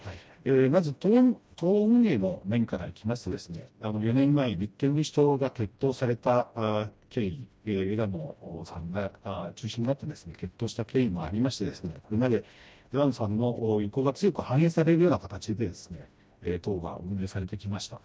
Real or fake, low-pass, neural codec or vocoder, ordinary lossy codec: fake; none; codec, 16 kHz, 1 kbps, FreqCodec, smaller model; none